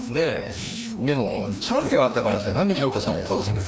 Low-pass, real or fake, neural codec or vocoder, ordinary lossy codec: none; fake; codec, 16 kHz, 1 kbps, FreqCodec, larger model; none